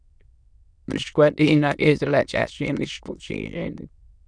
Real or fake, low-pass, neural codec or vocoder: fake; 9.9 kHz; autoencoder, 22.05 kHz, a latent of 192 numbers a frame, VITS, trained on many speakers